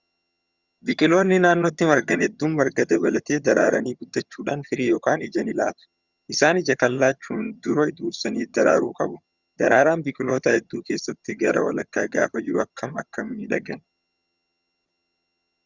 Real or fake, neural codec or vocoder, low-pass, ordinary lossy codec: fake; vocoder, 22.05 kHz, 80 mel bands, HiFi-GAN; 7.2 kHz; Opus, 64 kbps